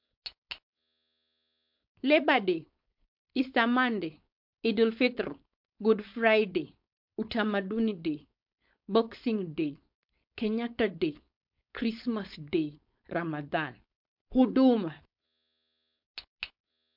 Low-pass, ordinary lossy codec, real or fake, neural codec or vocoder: 5.4 kHz; MP3, 48 kbps; fake; codec, 16 kHz, 4.8 kbps, FACodec